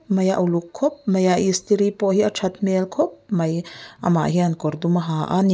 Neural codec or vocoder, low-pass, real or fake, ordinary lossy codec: none; none; real; none